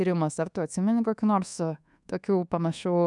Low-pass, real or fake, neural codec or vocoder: 10.8 kHz; fake; codec, 24 kHz, 1.2 kbps, DualCodec